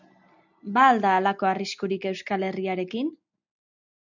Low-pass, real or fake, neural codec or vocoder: 7.2 kHz; real; none